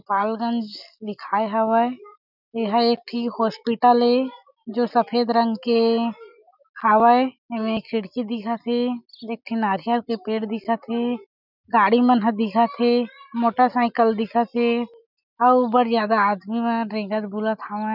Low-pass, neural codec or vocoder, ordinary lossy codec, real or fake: 5.4 kHz; none; none; real